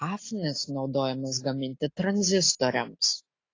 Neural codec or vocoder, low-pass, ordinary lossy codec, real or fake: none; 7.2 kHz; AAC, 32 kbps; real